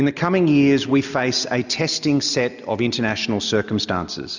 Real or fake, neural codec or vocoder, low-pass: real; none; 7.2 kHz